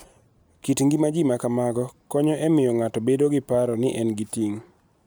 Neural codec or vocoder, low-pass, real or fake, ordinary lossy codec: none; none; real; none